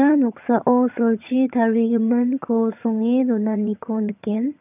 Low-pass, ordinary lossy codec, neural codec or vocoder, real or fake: 3.6 kHz; none; vocoder, 22.05 kHz, 80 mel bands, HiFi-GAN; fake